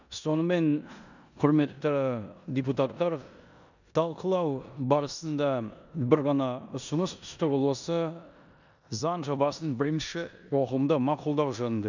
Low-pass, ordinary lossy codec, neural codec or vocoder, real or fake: 7.2 kHz; none; codec, 16 kHz in and 24 kHz out, 0.9 kbps, LongCat-Audio-Codec, four codebook decoder; fake